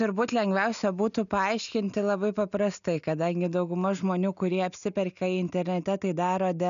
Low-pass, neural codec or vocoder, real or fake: 7.2 kHz; none; real